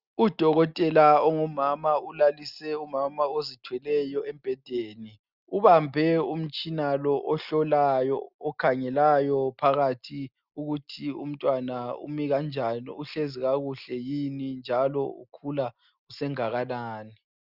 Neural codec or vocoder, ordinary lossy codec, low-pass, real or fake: none; Opus, 64 kbps; 5.4 kHz; real